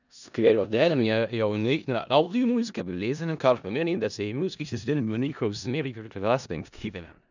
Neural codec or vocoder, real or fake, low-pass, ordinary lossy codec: codec, 16 kHz in and 24 kHz out, 0.4 kbps, LongCat-Audio-Codec, four codebook decoder; fake; 7.2 kHz; none